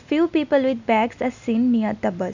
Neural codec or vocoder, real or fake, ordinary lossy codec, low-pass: none; real; none; 7.2 kHz